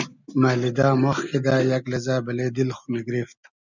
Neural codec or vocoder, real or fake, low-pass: none; real; 7.2 kHz